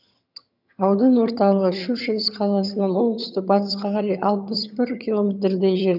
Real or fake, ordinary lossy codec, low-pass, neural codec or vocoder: fake; none; 5.4 kHz; vocoder, 22.05 kHz, 80 mel bands, HiFi-GAN